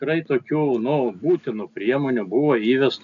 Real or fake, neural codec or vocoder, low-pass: real; none; 7.2 kHz